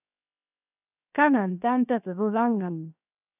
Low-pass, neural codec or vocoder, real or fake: 3.6 kHz; codec, 16 kHz, 0.7 kbps, FocalCodec; fake